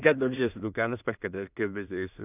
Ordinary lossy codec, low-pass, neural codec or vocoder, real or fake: none; 3.6 kHz; codec, 16 kHz in and 24 kHz out, 0.4 kbps, LongCat-Audio-Codec, two codebook decoder; fake